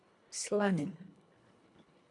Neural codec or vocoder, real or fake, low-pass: codec, 24 kHz, 1.5 kbps, HILCodec; fake; 10.8 kHz